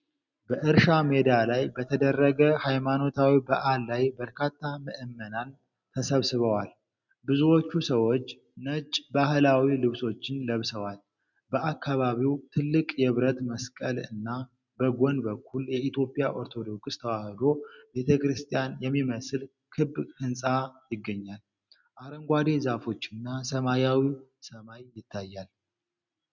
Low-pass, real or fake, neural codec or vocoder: 7.2 kHz; real; none